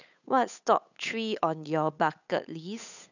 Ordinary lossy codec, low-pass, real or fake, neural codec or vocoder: none; 7.2 kHz; fake; codec, 16 kHz, 8 kbps, FunCodec, trained on Chinese and English, 25 frames a second